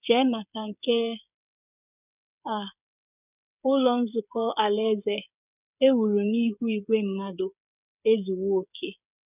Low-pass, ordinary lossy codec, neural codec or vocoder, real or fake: 3.6 kHz; none; codec, 16 kHz, 8 kbps, FreqCodec, smaller model; fake